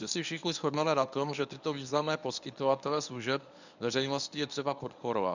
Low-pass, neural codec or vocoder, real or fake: 7.2 kHz; codec, 24 kHz, 0.9 kbps, WavTokenizer, medium speech release version 1; fake